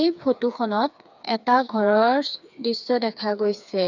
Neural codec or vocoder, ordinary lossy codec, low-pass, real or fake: codec, 16 kHz, 4 kbps, FreqCodec, smaller model; none; 7.2 kHz; fake